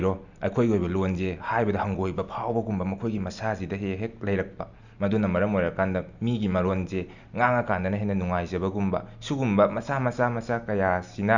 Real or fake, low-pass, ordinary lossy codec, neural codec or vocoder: real; 7.2 kHz; none; none